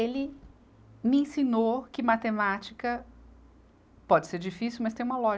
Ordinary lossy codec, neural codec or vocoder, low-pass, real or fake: none; none; none; real